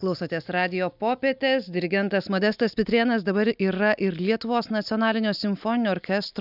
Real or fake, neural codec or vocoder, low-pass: real; none; 5.4 kHz